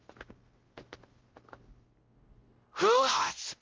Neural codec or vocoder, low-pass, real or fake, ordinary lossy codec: codec, 16 kHz, 0.5 kbps, X-Codec, WavLM features, trained on Multilingual LibriSpeech; 7.2 kHz; fake; Opus, 16 kbps